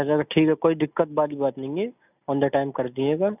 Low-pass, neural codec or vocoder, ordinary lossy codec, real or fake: 3.6 kHz; none; none; real